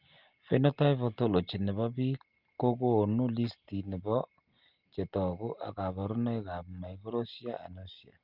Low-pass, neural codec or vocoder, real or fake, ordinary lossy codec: 5.4 kHz; none; real; Opus, 24 kbps